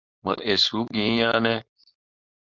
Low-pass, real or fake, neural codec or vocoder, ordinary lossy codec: 7.2 kHz; fake; codec, 16 kHz, 4.8 kbps, FACodec; Opus, 64 kbps